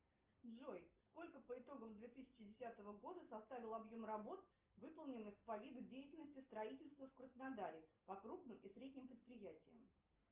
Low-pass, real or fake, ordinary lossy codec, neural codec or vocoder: 3.6 kHz; real; Opus, 24 kbps; none